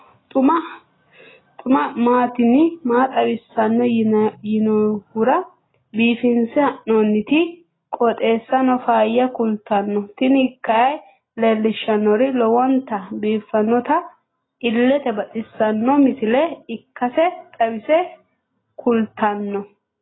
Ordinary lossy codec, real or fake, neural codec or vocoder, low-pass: AAC, 16 kbps; real; none; 7.2 kHz